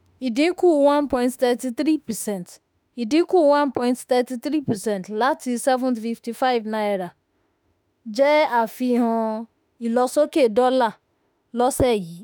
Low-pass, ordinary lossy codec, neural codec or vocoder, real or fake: none; none; autoencoder, 48 kHz, 32 numbers a frame, DAC-VAE, trained on Japanese speech; fake